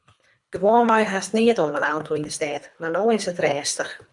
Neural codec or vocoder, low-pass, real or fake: codec, 24 kHz, 3 kbps, HILCodec; 10.8 kHz; fake